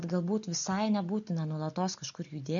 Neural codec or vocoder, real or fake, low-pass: none; real; 7.2 kHz